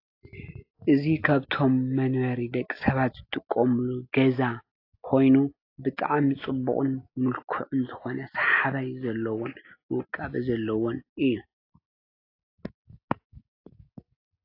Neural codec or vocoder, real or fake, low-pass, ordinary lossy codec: none; real; 5.4 kHz; AAC, 32 kbps